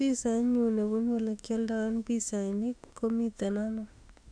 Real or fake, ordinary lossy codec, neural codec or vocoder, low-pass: fake; none; autoencoder, 48 kHz, 32 numbers a frame, DAC-VAE, trained on Japanese speech; 9.9 kHz